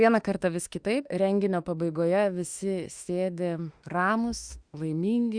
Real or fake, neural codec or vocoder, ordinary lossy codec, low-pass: fake; autoencoder, 48 kHz, 32 numbers a frame, DAC-VAE, trained on Japanese speech; Opus, 64 kbps; 9.9 kHz